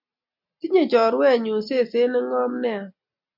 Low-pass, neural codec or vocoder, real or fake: 5.4 kHz; none; real